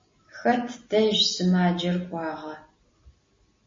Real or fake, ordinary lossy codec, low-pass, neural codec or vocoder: real; MP3, 32 kbps; 7.2 kHz; none